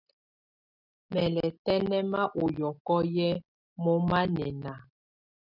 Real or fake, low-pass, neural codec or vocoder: real; 5.4 kHz; none